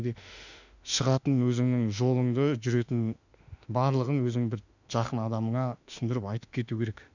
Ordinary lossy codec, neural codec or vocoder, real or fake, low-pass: none; autoencoder, 48 kHz, 32 numbers a frame, DAC-VAE, trained on Japanese speech; fake; 7.2 kHz